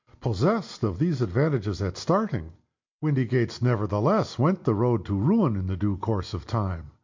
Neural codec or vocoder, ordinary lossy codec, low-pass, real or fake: none; MP3, 48 kbps; 7.2 kHz; real